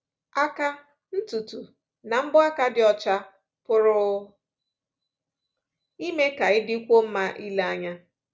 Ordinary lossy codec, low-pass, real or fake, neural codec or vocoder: none; none; real; none